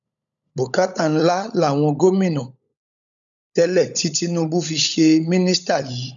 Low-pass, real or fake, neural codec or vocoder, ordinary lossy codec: 7.2 kHz; fake; codec, 16 kHz, 16 kbps, FunCodec, trained on LibriTTS, 50 frames a second; none